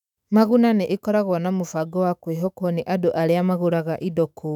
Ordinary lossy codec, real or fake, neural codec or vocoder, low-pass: none; fake; autoencoder, 48 kHz, 128 numbers a frame, DAC-VAE, trained on Japanese speech; 19.8 kHz